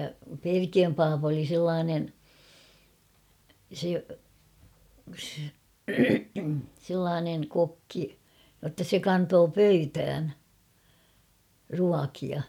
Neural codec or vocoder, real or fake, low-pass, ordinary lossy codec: none; real; 19.8 kHz; none